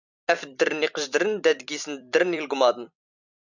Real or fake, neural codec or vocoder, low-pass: fake; vocoder, 44.1 kHz, 128 mel bands every 256 samples, BigVGAN v2; 7.2 kHz